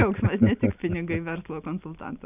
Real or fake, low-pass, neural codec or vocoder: real; 3.6 kHz; none